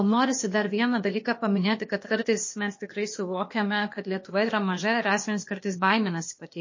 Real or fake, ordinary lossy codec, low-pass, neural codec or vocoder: fake; MP3, 32 kbps; 7.2 kHz; codec, 16 kHz, 0.8 kbps, ZipCodec